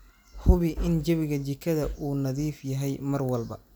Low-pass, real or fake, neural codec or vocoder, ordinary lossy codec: none; real; none; none